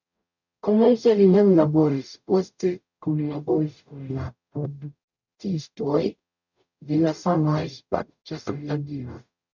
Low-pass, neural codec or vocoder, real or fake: 7.2 kHz; codec, 44.1 kHz, 0.9 kbps, DAC; fake